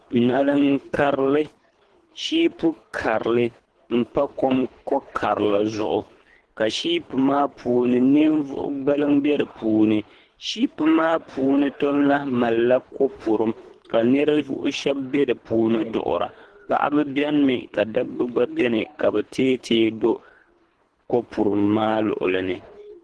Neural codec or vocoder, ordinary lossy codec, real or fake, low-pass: codec, 24 kHz, 3 kbps, HILCodec; Opus, 16 kbps; fake; 10.8 kHz